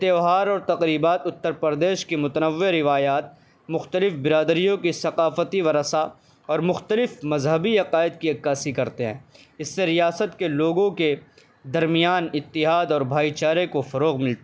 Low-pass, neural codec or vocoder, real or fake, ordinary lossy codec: none; none; real; none